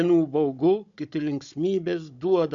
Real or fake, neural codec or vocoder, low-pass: fake; codec, 16 kHz, 16 kbps, FunCodec, trained on Chinese and English, 50 frames a second; 7.2 kHz